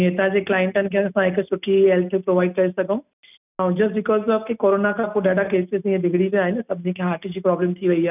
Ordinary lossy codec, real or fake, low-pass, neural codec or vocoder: none; real; 3.6 kHz; none